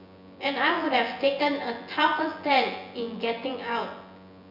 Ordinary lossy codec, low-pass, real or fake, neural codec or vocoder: none; 5.4 kHz; fake; vocoder, 24 kHz, 100 mel bands, Vocos